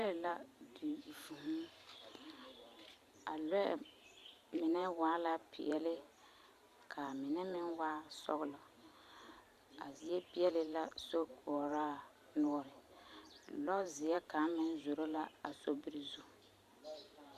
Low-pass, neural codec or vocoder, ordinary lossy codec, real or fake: 14.4 kHz; vocoder, 44.1 kHz, 128 mel bands every 512 samples, BigVGAN v2; Opus, 64 kbps; fake